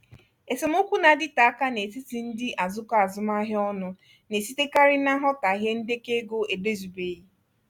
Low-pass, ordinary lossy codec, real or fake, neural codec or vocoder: 19.8 kHz; none; real; none